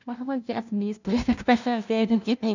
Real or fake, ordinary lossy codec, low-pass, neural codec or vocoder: fake; none; 7.2 kHz; codec, 16 kHz, 0.5 kbps, FunCodec, trained on LibriTTS, 25 frames a second